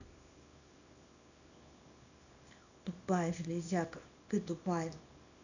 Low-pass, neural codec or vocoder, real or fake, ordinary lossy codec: 7.2 kHz; codec, 24 kHz, 0.9 kbps, WavTokenizer, small release; fake; none